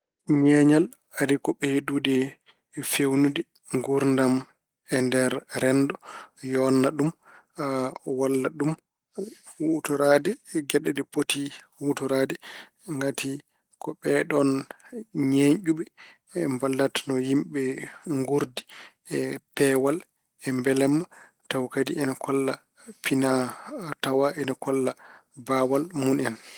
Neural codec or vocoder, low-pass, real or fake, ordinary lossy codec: none; 19.8 kHz; real; Opus, 24 kbps